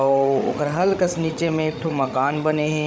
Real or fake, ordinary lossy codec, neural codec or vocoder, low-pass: fake; none; codec, 16 kHz, 16 kbps, FreqCodec, larger model; none